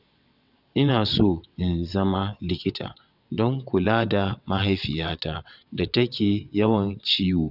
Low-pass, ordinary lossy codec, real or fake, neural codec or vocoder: 5.4 kHz; none; fake; vocoder, 22.05 kHz, 80 mel bands, WaveNeXt